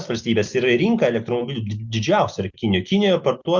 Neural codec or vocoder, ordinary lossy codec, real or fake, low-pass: none; Opus, 64 kbps; real; 7.2 kHz